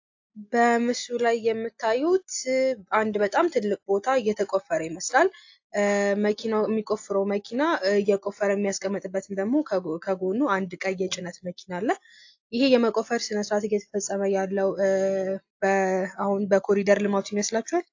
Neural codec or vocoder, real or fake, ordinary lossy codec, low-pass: none; real; AAC, 48 kbps; 7.2 kHz